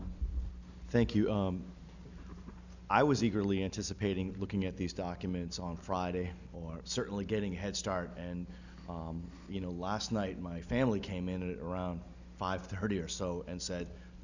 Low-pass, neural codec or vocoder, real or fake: 7.2 kHz; none; real